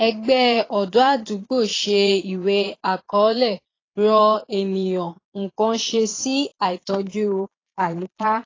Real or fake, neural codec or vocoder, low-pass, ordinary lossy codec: fake; vocoder, 22.05 kHz, 80 mel bands, Vocos; 7.2 kHz; AAC, 32 kbps